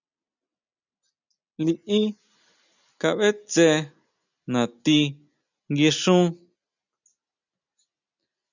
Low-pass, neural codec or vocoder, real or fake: 7.2 kHz; none; real